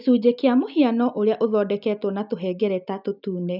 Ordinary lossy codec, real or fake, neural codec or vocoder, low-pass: none; real; none; 5.4 kHz